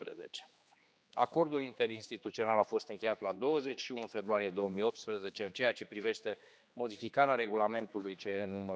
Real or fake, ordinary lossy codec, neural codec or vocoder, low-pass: fake; none; codec, 16 kHz, 2 kbps, X-Codec, HuBERT features, trained on general audio; none